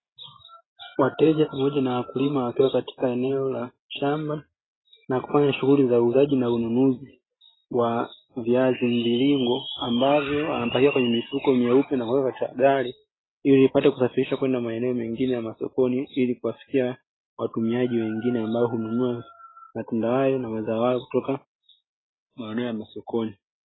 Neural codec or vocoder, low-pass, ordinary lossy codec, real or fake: none; 7.2 kHz; AAC, 16 kbps; real